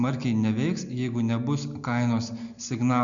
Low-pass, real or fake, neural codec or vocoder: 7.2 kHz; real; none